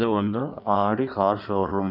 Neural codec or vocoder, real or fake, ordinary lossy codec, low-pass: codec, 16 kHz in and 24 kHz out, 1.1 kbps, FireRedTTS-2 codec; fake; none; 5.4 kHz